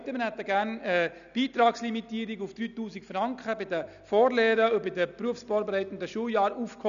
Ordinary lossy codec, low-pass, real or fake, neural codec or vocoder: none; 7.2 kHz; real; none